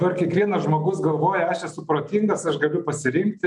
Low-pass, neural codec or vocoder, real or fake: 10.8 kHz; none; real